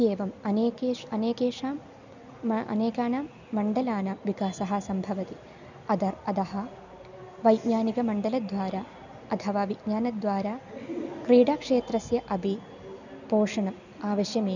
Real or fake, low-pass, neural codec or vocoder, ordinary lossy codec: real; 7.2 kHz; none; none